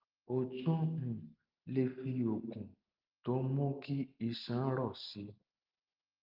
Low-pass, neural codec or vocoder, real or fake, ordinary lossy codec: 5.4 kHz; none; real; Opus, 32 kbps